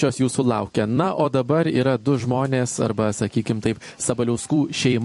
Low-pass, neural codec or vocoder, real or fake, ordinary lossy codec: 14.4 kHz; vocoder, 44.1 kHz, 128 mel bands every 256 samples, BigVGAN v2; fake; MP3, 48 kbps